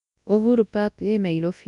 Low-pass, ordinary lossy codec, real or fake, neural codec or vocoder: 10.8 kHz; none; fake; codec, 24 kHz, 0.9 kbps, WavTokenizer, large speech release